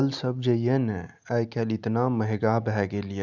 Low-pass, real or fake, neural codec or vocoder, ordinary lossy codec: 7.2 kHz; real; none; none